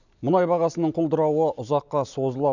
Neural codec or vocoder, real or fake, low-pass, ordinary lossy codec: none; real; 7.2 kHz; none